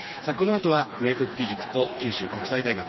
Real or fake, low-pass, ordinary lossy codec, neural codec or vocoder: fake; 7.2 kHz; MP3, 24 kbps; codec, 16 kHz, 2 kbps, FreqCodec, smaller model